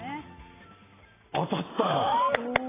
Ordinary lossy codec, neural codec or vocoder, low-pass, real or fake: AAC, 16 kbps; none; 3.6 kHz; real